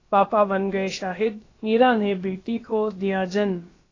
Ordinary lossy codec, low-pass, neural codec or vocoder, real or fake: AAC, 32 kbps; 7.2 kHz; codec, 16 kHz, about 1 kbps, DyCAST, with the encoder's durations; fake